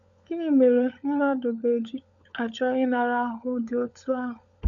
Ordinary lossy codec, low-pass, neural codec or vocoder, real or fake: MP3, 96 kbps; 7.2 kHz; codec, 16 kHz, 16 kbps, FunCodec, trained on LibriTTS, 50 frames a second; fake